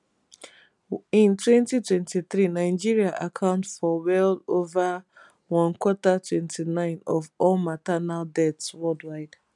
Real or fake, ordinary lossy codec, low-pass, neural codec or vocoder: real; none; 10.8 kHz; none